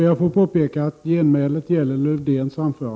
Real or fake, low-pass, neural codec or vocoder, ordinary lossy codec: real; none; none; none